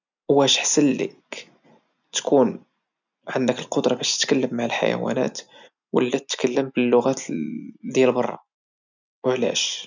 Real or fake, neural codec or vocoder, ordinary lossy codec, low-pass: real; none; none; 7.2 kHz